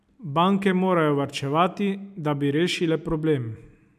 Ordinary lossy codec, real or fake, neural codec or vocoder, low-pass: none; real; none; 14.4 kHz